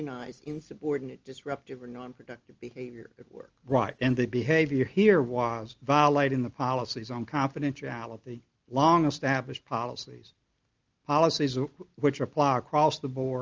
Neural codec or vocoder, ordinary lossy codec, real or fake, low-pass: none; Opus, 24 kbps; real; 7.2 kHz